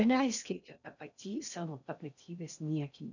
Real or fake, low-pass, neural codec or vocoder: fake; 7.2 kHz; codec, 16 kHz in and 24 kHz out, 0.6 kbps, FocalCodec, streaming, 2048 codes